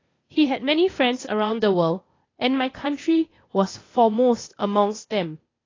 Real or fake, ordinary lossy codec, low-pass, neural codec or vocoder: fake; AAC, 32 kbps; 7.2 kHz; codec, 16 kHz, 0.8 kbps, ZipCodec